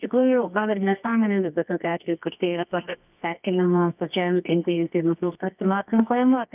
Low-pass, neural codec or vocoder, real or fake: 3.6 kHz; codec, 24 kHz, 0.9 kbps, WavTokenizer, medium music audio release; fake